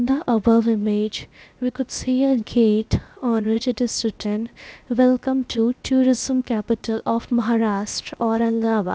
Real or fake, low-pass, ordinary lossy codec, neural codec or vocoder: fake; none; none; codec, 16 kHz, 0.7 kbps, FocalCodec